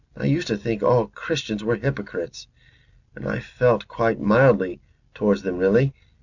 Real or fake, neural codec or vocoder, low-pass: real; none; 7.2 kHz